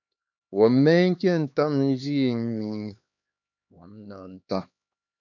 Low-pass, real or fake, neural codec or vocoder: 7.2 kHz; fake; codec, 16 kHz, 2 kbps, X-Codec, HuBERT features, trained on LibriSpeech